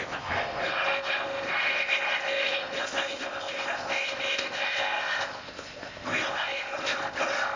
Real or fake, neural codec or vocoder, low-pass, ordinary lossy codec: fake; codec, 16 kHz in and 24 kHz out, 0.8 kbps, FocalCodec, streaming, 65536 codes; 7.2 kHz; MP3, 48 kbps